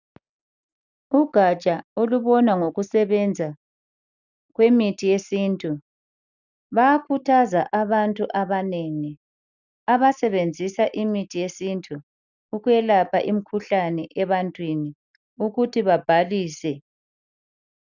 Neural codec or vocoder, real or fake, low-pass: none; real; 7.2 kHz